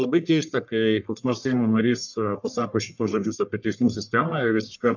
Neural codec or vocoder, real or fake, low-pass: codec, 44.1 kHz, 1.7 kbps, Pupu-Codec; fake; 7.2 kHz